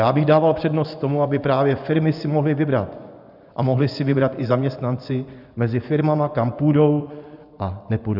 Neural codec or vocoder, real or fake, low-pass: vocoder, 24 kHz, 100 mel bands, Vocos; fake; 5.4 kHz